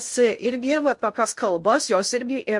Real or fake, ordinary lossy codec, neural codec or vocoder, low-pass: fake; MP3, 64 kbps; codec, 16 kHz in and 24 kHz out, 0.6 kbps, FocalCodec, streaming, 2048 codes; 10.8 kHz